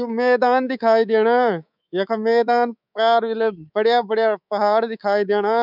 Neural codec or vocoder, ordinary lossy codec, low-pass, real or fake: codec, 24 kHz, 3.1 kbps, DualCodec; none; 5.4 kHz; fake